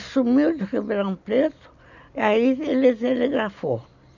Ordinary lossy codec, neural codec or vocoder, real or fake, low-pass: none; none; real; 7.2 kHz